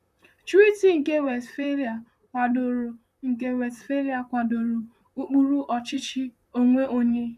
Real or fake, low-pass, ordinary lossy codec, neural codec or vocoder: fake; 14.4 kHz; none; vocoder, 44.1 kHz, 128 mel bands, Pupu-Vocoder